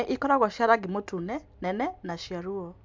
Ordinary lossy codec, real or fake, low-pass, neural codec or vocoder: MP3, 64 kbps; real; 7.2 kHz; none